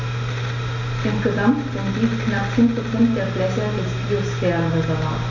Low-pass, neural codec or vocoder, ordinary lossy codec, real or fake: 7.2 kHz; none; MP3, 64 kbps; real